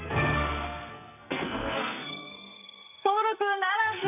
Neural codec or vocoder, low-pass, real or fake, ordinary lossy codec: codec, 44.1 kHz, 2.6 kbps, SNAC; 3.6 kHz; fake; none